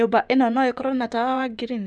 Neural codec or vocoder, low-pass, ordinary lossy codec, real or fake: vocoder, 24 kHz, 100 mel bands, Vocos; none; none; fake